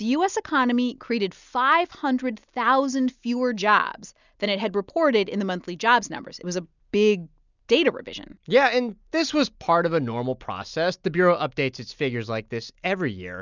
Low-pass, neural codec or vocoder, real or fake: 7.2 kHz; none; real